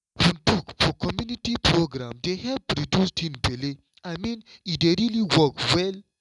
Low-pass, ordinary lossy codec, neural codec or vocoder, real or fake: 10.8 kHz; none; none; real